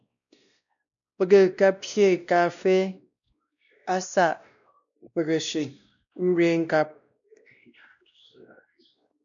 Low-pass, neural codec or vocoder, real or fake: 7.2 kHz; codec, 16 kHz, 1 kbps, X-Codec, WavLM features, trained on Multilingual LibriSpeech; fake